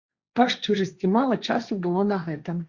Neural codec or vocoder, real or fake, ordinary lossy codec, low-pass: codec, 16 kHz, 1.1 kbps, Voila-Tokenizer; fake; Opus, 64 kbps; 7.2 kHz